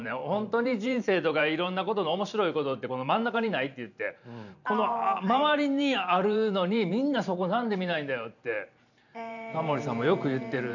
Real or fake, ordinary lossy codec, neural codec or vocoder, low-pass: fake; none; vocoder, 44.1 kHz, 128 mel bands every 512 samples, BigVGAN v2; 7.2 kHz